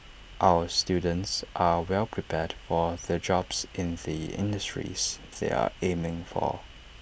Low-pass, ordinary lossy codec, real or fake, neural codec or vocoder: none; none; real; none